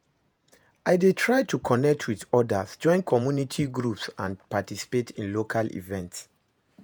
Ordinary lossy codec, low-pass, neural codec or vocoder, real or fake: none; none; vocoder, 48 kHz, 128 mel bands, Vocos; fake